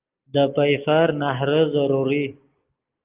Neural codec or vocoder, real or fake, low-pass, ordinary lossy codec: none; real; 3.6 kHz; Opus, 24 kbps